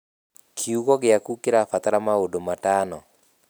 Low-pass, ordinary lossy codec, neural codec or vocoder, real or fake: none; none; none; real